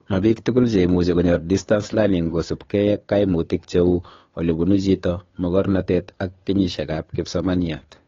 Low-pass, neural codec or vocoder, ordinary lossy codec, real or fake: 7.2 kHz; codec, 16 kHz, 2 kbps, FunCodec, trained on Chinese and English, 25 frames a second; AAC, 32 kbps; fake